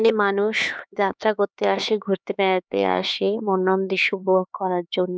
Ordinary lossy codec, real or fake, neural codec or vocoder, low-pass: none; fake; codec, 16 kHz, 4 kbps, X-Codec, HuBERT features, trained on LibriSpeech; none